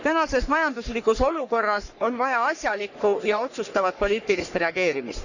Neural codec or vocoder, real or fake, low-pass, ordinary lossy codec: codec, 44.1 kHz, 3.4 kbps, Pupu-Codec; fake; 7.2 kHz; none